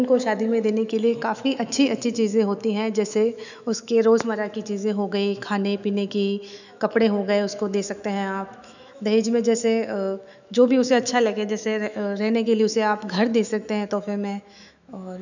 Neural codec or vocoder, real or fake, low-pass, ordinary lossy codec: autoencoder, 48 kHz, 128 numbers a frame, DAC-VAE, trained on Japanese speech; fake; 7.2 kHz; none